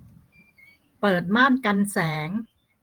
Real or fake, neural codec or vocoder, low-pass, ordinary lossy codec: fake; vocoder, 48 kHz, 128 mel bands, Vocos; 19.8 kHz; Opus, 24 kbps